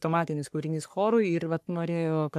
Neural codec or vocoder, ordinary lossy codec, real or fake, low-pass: codec, 44.1 kHz, 3.4 kbps, Pupu-Codec; AAC, 96 kbps; fake; 14.4 kHz